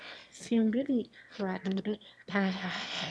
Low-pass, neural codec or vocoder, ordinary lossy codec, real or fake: none; autoencoder, 22.05 kHz, a latent of 192 numbers a frame, VITS, trained on one speaker; none; fake